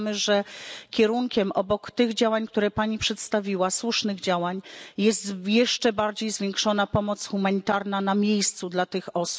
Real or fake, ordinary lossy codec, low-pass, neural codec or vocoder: real; none; none; none